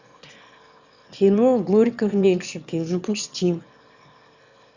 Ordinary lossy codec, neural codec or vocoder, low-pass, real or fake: Opus, 64 kbps; autoencoder, 22.05 kHz, a latent of 192 numbers a frame, VITS, trained on one speaker; 7.2 kHz; fake